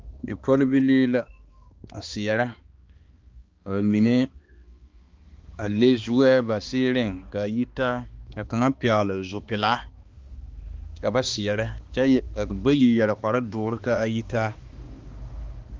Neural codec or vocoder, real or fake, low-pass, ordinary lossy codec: codec, 16 kHz, 2 kbps, X-Codec, HuBERT features, trained on balanced general audio; fake; 7.2 kHz; Opus, 32 kbps